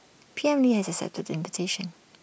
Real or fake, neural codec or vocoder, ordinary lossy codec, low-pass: real; none; none; none